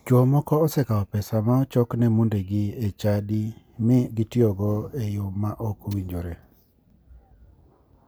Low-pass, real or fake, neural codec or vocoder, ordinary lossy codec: none; real; none; none